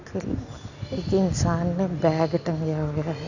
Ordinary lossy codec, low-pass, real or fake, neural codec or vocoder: none; 7.2 kHz; real; none